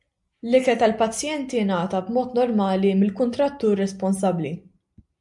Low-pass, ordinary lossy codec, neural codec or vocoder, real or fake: 10.8 kHz; MP3, 64 kbps; none; real